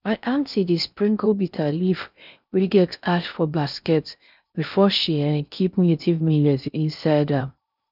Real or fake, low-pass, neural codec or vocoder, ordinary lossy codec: fake; 5.4 kHz; codec, 16 kHz in and 24 kHz out, 0.6 kbps, FocalCodec, streaming, 4096 codes; none